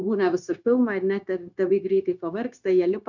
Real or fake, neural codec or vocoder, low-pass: fake; codec, 16 kHz, 0.9 kbps, LongCat-Audio-Codec; 7.2 kHz